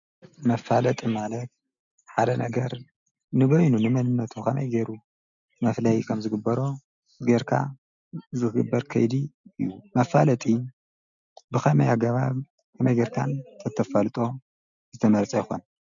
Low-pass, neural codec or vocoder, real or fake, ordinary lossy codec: 7.2 kHz; none; real; MP3, 96 kbps